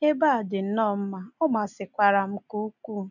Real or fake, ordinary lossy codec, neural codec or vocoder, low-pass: real; none; none; 7.2 kHz